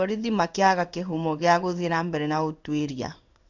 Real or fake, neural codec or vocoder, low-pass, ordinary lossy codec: fake; codec, 16 kHz in and 24 kHz out, 1 kbps, XY-Tokenizer; 7.2 kHz; none